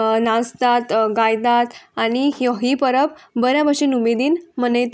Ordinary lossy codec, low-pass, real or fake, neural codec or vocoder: none; none; real; none